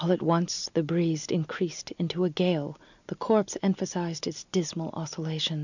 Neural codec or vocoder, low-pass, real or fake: none; 7.2 kHz; real